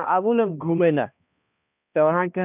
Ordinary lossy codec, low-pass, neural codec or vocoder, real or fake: none; 3.6 kHz; codec, 16 kHz, 1 kbps, X-Codec, HuBERT features, trained on balanced general audio; fake